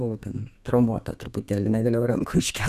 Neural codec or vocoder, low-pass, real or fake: codec, 32 kHz, 1.9 kbps, SNAC; 14.4 kHz; fake